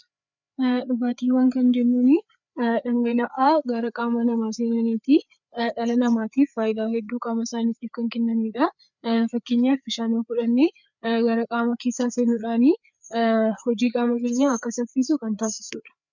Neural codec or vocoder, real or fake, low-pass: codec, 16 kHz, 4 kbps, FreqCodec, larger model; fake; 7.2 kHz